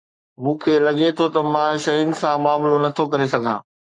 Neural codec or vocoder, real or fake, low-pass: codec, 44.1 kHz, 3.4 kbps, Pupu-Codec; fake; 10.8 kHz